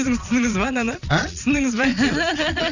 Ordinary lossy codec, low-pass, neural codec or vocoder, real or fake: none; 7.2 kHz; vocoder, 22.05 kHz, 80 mel bands, Vocos; fake